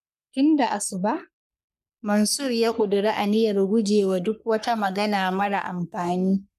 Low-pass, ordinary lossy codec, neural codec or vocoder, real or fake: 14.4 kHz; none; codec, 44.1 kHz, 3.4 kbps, Pupu-Codec; fake